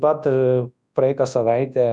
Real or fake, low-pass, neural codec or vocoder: fake; 10.8 kHz; codec, 24 kHz, 0.9 kbps, WavTokenizer, large speech release